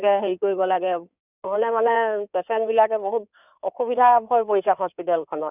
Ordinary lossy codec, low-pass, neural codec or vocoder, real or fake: none; 3.6 kHz; codec, 16 kHz in and 24 kHz out, 2.2 kbps, FireRedTTS-2 codec; fake